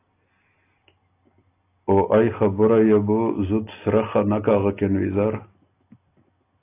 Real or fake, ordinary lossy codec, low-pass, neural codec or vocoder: real; AAC, 24 kbps; 3.6 kHz; none